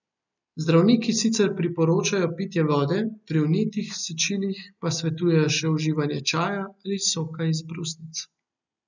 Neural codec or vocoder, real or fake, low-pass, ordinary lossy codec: none; real; 7.2 kHz; none